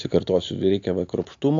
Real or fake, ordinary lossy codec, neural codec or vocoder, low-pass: real; AAC, 48 kbps; none; 7.2 kHz